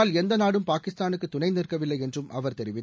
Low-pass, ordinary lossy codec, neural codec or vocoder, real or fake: none; none; none; real